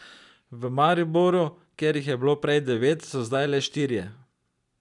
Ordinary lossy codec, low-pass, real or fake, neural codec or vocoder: none; 10.8 kHz; real; none